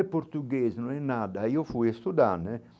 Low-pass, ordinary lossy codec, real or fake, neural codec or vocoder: none; none; real; none